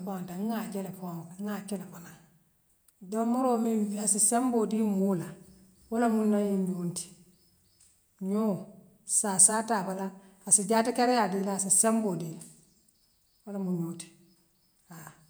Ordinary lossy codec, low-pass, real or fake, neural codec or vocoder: none; none; fake; vocoder, 48 kHz, 128 mel bands, Vocos